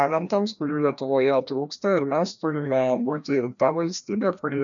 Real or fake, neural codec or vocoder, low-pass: fake; codec, 16 kHz, 1 kbps, FreqCodec, larger model; 7.2 kHz